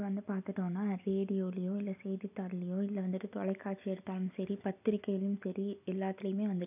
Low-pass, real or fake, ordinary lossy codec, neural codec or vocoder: 3.6 kHz; real; none; none